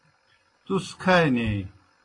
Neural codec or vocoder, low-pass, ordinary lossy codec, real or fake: none; 10.8 kHz; AAC, 32 kbps; real